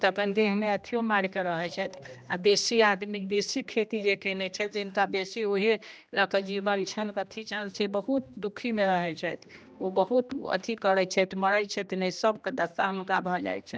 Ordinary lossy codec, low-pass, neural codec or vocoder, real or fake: none; none; codec, 16 kHz, 1 kbps, X-Codec, HuBERT features, trained on general audio; fake